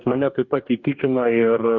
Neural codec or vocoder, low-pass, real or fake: codec, 44.1 kHz, 2.6 kbps, DAC; 7.2 kHz; fake